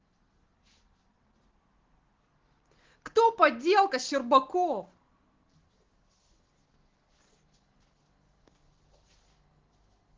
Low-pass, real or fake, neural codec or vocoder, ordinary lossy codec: 7.2 kHz; real; none; Opus, 16 kbps